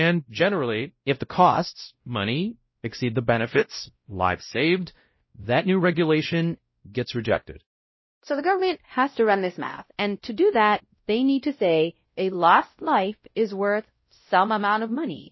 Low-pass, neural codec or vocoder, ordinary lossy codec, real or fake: 7.2 kHz; codec, 16 kHz, 0.5 kbps, X-Codec, WavLM features, trained on Multilingual LibriSpeech; MP3, 24 kbps; fake